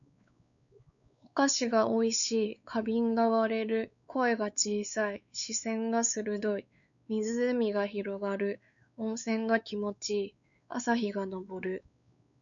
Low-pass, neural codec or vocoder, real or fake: 7.2 kHz; codec, 16 kHz, 4 kbps, X-Codec, WavLM features, trained on Multilingual LibriSpeech; fake